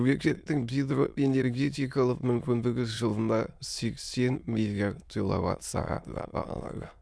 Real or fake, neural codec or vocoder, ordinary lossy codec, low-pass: fake; autoencoder, 22.05 kHz, a latent of 192 numbers a frame, VITS, trained on many speakers; none; none